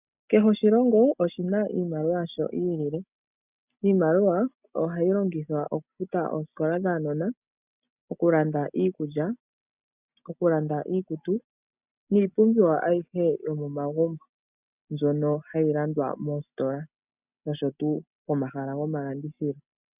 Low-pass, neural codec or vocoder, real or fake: 3.6 kHz; none; real